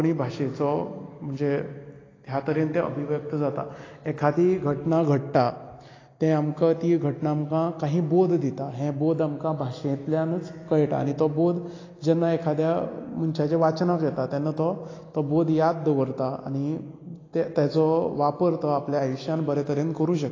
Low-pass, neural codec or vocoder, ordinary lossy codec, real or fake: 7.2 kHz; none; AAC, 32 kbps; real